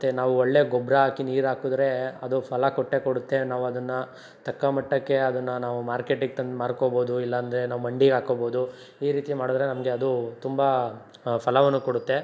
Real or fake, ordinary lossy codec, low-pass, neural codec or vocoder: real; none; none; none